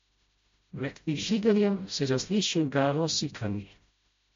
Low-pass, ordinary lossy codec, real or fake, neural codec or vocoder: 7.2 kHz; MP3, 48 kbps; fake; codec, 16 kHz, 0.5 kbps, FreqCodec, smaller model